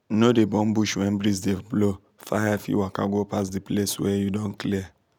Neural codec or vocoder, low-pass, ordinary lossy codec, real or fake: none; 19.8 kHz; none; real